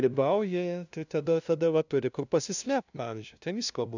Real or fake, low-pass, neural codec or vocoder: fake; 7.2 kHz; codec, 16 kHz, 0.5 kbps, FunCodec, trained on LibriTTS, 25 frames a second